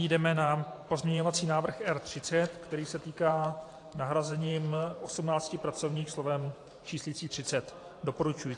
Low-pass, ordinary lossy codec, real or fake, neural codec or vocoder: 10.8 kHz; AAC, 48 kbps; fake; vocoder, 44.1 kHz, 128 mel bands every 512 samples, BigVGAN v2